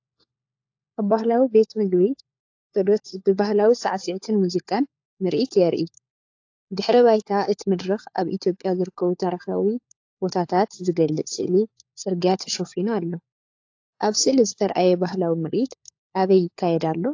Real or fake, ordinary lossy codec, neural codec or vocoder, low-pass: fake; AAC, 48 kbps; codec, 16 kHz, 4 kbps, FunCodec, trained on LibriTTS, 50 frames a second; 7.2 kHz